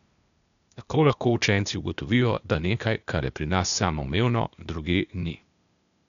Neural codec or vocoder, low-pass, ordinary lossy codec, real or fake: codec, 16 kHz, 0.8 kbps, ZipCodec; 7.2 kHz; none; fake